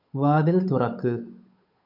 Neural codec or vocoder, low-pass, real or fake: codec, 16 kHz, 8 kbps, FunCodec, trained on Chinese and English, 25 frames a second; 5.4 kHz; fake